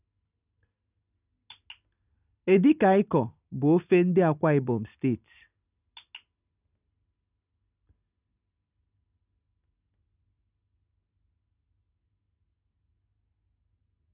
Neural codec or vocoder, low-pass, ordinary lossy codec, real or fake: none; 3.6 kHz; none; real